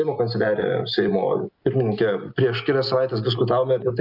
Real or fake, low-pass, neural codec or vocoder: real; 5.4 kHz; none